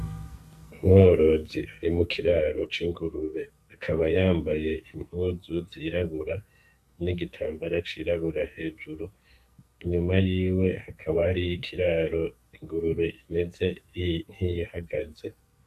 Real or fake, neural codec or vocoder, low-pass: fake; codec, 44.1 kHz, 2.6 kbps, SNAC; 14.4 kHz